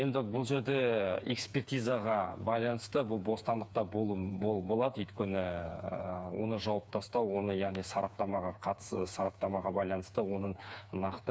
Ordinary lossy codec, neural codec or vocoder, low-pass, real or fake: none; codec, 16 kHz, 4 kbps, FreqCodec, smaller model; none; fake